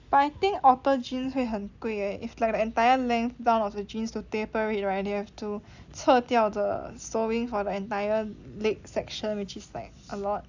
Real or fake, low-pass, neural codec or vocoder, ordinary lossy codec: real; 7.2 kHz; none; Opus, 64 kbps